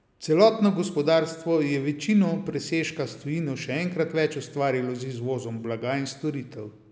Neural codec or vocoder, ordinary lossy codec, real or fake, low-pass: none; none; real; none